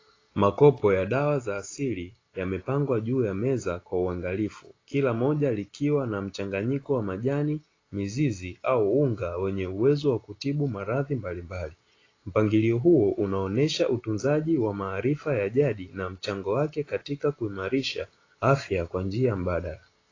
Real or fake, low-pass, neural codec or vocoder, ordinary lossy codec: real; 7.2 kHz; none; AAC, 32 kbps